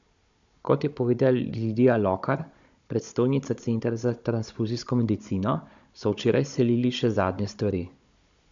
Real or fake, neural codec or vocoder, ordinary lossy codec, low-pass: fake; codec, 16 kHz, 16 kbps, FunCodec, trained on Chinese and English, 50 frames a second; MP3, 64 kbps; 7.2 kHz